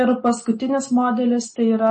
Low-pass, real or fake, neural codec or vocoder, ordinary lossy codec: 10.8 kHz; real; none; MP3, 32 kbps